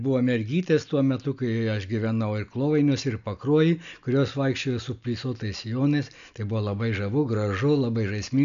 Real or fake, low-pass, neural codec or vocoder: real; 7.2 kHz; none